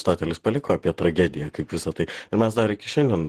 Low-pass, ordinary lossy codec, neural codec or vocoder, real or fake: 14.4 kHz; Opus, 16 kbps; vocoder, 48 kHz, 128 mel bands, Vocos; fake